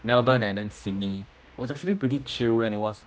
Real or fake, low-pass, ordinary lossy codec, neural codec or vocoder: fake; none; none; codec, 16 kHz, 1 kbps, X-Codec, HuBERT features, trained on general audio